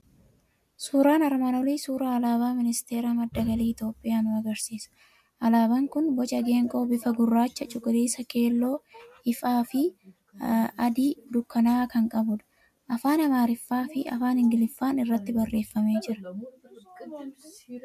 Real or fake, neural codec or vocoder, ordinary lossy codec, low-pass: real; none; MP3, 96 kbps; 14.4 kHz